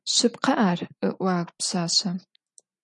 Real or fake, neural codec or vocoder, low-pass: real; none; 10.8 kHz